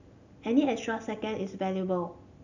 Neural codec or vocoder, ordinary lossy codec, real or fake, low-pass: none; none; real; 7.2 kHz